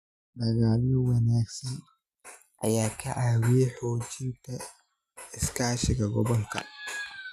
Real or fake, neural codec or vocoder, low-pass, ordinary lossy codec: real; none; 14.4 kHz; none